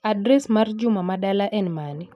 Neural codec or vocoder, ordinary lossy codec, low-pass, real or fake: none; none; none; real